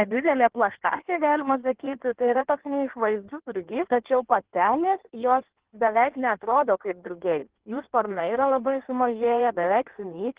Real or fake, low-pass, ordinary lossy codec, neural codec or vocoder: fake; 3.6 kHz; Opus, 16 kbps; codec, 16 kHz in and 24 kHz out, 1.1 kbps, FireRedTTS-2 codec